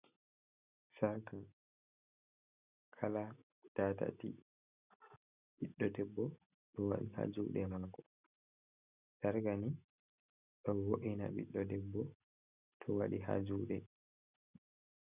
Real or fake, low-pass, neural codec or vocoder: real; 3.6 kHz; none